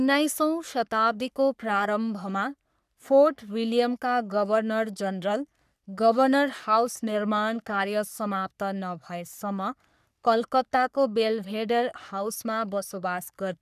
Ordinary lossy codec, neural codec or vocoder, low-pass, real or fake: none; codec, 44.1 kHz, 3.4 kbps, Pupu-Codec; 14.4 kHz; fake